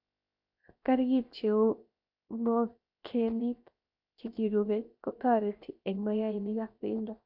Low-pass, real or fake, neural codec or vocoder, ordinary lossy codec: 5.4 kHz; fake; codec, 16 kHz, 0.7 kbps, FocalCodec; Opus, 64 kbps